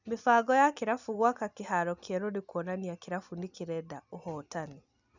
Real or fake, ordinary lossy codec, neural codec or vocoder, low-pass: real; none; none; 7.2 kHz